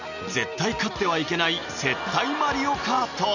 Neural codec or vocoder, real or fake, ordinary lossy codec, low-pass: none; real; none; 7.2 kHz